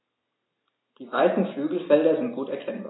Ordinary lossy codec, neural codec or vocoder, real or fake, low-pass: AAC, 16 kbps; none; real; 7.2 kHz